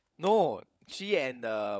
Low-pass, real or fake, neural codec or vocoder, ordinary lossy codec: none; fake; codec, 16 kHz, 16 kbps, FunCodec, trained on LibriTTS, 50 frames a second; none